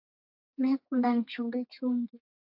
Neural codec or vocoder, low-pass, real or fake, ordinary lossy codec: codec, 32 kHz, 1.9 kbps, SNAC; 5.4 kHz; fake; AAC, 48 kbps